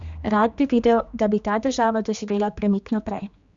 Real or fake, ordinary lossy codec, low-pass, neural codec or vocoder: fake; Opus, 64 kbps; 7.2 kHz; codec, 16 kHz, 2 kbps, X-Codec, HuBERT features, trained on general audio